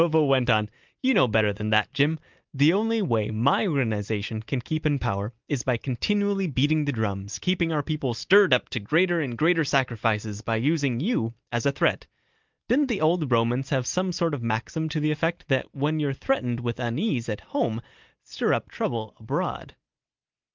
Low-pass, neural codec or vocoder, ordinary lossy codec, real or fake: 7.2 kHz; none; Opus, 24 kbps; real